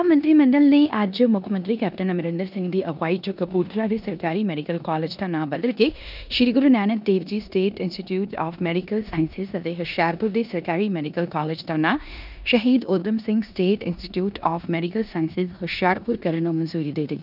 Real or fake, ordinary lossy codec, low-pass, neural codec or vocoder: fake; none; 5.4 kHz; codec, 16 kHz in and 24 kHz out, 0.9 kbps, LongCat-Audio-Codec, four codebook decoder